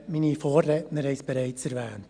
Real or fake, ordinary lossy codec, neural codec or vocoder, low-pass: real; Opus, 64 kbps; none; 9.9 kHz